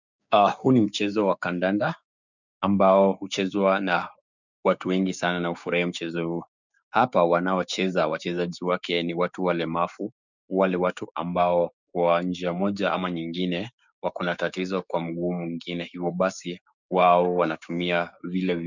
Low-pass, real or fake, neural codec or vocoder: 7.2 kHz; fake; codec, 16 kHz, 6 kbps, DAC